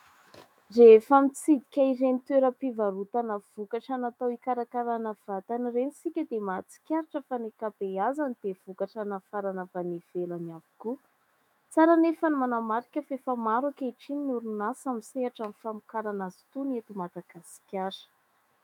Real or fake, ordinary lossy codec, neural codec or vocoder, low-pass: fake; MP3, 96 kbps; autoencoder, 48 kHz, 128 numbers a frame, DAC-VAE, trained on Japanese speech; 19.8 kHz